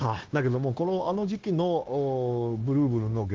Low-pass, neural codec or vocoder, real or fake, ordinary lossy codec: 7.2 kHz; codec, 16 kHz, 0.9 kbps, LongCat-Audio-Codec; fake; Opus, 16 kbps